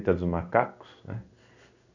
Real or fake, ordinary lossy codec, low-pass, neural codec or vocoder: fake; none; 7.2 kHz; codec, 16 kHz in and 24 kHz out, 1 kbps, XY-Tokenizer